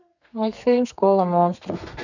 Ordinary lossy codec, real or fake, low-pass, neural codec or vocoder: none; fake; 7.2 kHz; codec, 32 kHz, 1.9 kbps, SNAC